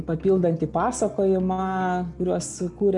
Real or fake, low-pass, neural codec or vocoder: real; 10.8 kHz; none